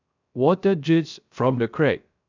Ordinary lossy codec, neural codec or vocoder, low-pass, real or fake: none; codec, 16 kHz, 0.3 kbps, FocalCodec; 7.2 kHz; fake